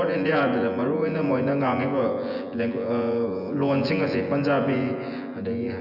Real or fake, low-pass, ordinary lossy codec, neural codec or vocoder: fake; 5.4 kHz; none; vocoder, 24 kHz, 100 mel bands, Vocos